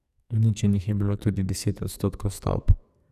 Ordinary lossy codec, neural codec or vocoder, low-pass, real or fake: none; codec, 44.1 kHz, 2.6 kbps, SNAC; 14.4 kHz; fake